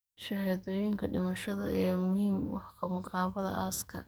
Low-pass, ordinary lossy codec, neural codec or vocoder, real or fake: none; none; codec, 44.1 kHz, 2.6 kbps, SNAC; fake